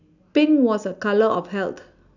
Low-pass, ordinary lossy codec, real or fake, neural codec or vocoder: 7.2 kHz; none; real; none